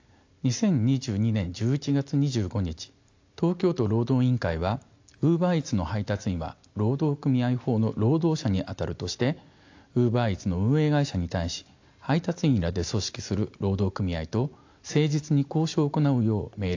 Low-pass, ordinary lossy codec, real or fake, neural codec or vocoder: 7.2 kHz; AAC, 48 kbps; real; none